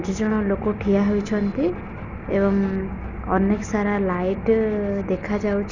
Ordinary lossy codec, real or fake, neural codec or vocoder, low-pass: none; real; none; 7.2 kHz